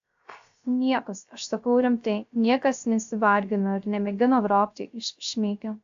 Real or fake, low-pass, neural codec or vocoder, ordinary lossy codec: fake; 7.2 kHz; codec, 16 kHz, 0.3 kbps, FocalCodec; AAC, 64 kbps